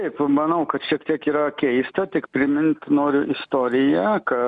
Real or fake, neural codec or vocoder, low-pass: real; none; 10.8 kHz